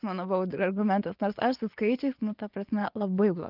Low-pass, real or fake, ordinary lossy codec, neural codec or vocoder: 5.4 kHz; fake; Opus, 32 kbps; vocoder, 24 kHz, 100 mel bands, Vocos